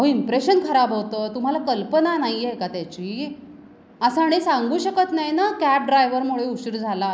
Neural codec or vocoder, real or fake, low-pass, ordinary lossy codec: none; real; none; none